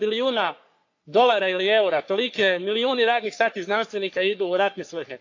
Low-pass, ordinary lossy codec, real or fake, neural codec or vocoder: 7.2 kHz; AAC, 48 kbps; fake; codec, 44.1 kHz, 3.4 kbps, Pupu-Codec